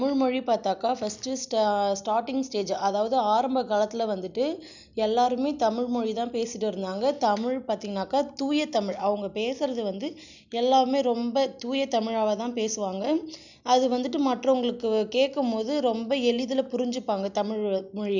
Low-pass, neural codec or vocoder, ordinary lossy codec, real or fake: 7.2 kHz; none; none; real